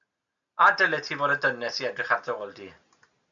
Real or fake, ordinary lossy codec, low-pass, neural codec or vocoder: real; MP3, 64 kbps; 7.2 kHz; none